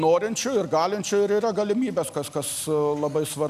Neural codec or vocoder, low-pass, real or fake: none; 14.4 kHz; real